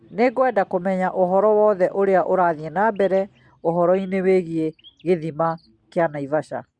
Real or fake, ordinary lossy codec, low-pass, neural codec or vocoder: real; Opus, 64 kbps; 9.9 kHz; none